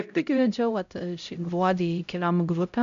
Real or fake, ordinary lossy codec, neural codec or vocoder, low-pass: fake; MP3, 64 kbps; codec, 16 kHz, 0.5 kbps, X-Codec, HuBERT features, trained on LibriSpeech; 7.2 kHz